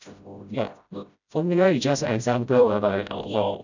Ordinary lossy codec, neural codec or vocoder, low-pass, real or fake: none; codec, 16 kHz, 0.5 kbps, FreqCodec, smaller model; 7.2 kHz; fake